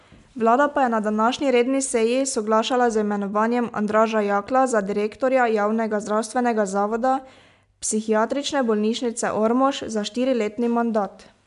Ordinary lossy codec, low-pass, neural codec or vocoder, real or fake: AAC, 96 kbps; 10.8 kHz; none; real